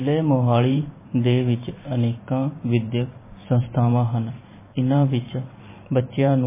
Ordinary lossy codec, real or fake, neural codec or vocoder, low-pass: MP3, 16 kbps; fake; vocoder, 44.1 kHz, 128 mel bands every 512 samples, BigVGAN v2; 3.6 kHz